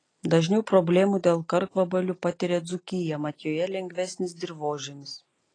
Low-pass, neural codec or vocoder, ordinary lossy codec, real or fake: 9.9 kHz; none; AAC, 32 kbps; real